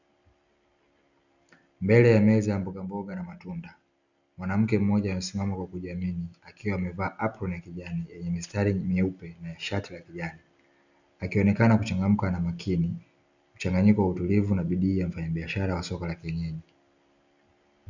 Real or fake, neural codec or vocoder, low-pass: real; none; 7.2 kHz